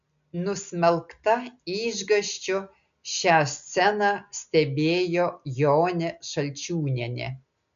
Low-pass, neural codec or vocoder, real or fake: 7.2 kHz; none; real